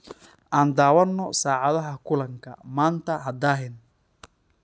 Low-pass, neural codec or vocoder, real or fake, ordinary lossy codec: none; none; real; none